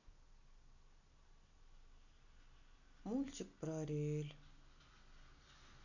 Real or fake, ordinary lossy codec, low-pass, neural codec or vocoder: real; none; 7.2 kHz; none